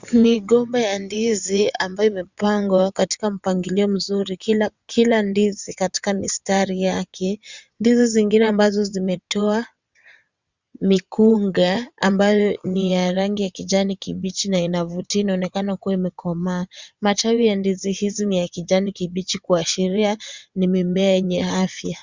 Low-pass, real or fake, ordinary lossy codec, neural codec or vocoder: 7.2 kHz; fake; Opus, 64 kbps; vocoder, 22.05 kHz, 80 mel bands, WaveNeXt